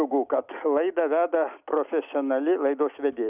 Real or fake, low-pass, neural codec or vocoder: real; 3.6 kHz; none